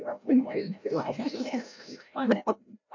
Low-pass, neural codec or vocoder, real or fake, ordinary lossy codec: 7.2 kHz; codec, 16 kHz, 0.5 kbps, FreqCodec, larger model; fake; MP3, 48 kbps